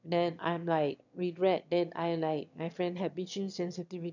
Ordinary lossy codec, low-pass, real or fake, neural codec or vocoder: none; 7.2 kHz; fake; autoencoder, 22.05 kHz, a latent of 192 numbers a frame, VITS, trained on one speaker